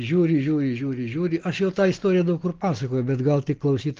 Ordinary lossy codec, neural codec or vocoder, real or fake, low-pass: Opus, 16 kbps; none; real; 7.2 kHz